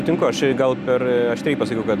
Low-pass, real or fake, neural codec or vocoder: 14.4 kHz; real; none